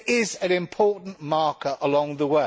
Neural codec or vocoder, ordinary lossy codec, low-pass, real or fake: none; none; none; real